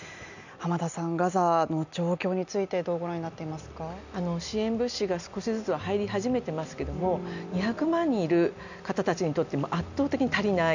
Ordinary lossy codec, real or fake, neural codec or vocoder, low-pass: none; real; none; 7.2 kHz